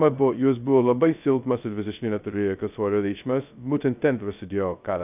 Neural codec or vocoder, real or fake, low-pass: codec, 16 kHz, 0.2 kbps, FocalCodec; fake; 3.6 kHz